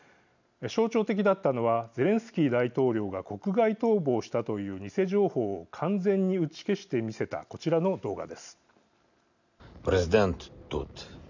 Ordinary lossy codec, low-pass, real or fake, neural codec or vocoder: none; 7.2 kHz; real; none